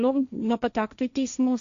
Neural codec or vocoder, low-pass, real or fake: codec, 16 kHz, 1.1 kbps, Voila-Tokenizer; 7.2 kHz; fake